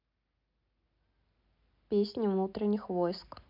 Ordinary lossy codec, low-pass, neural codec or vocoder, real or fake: MP3, 48 kbps; 5.4 kHz; none; real